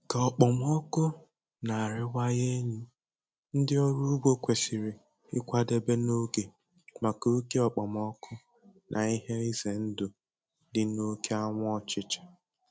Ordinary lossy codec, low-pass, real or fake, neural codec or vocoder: none; none; real; none